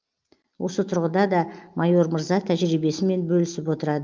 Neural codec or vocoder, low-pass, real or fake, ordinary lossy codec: none; 7.2 kHz; real; Opus, 24 kbps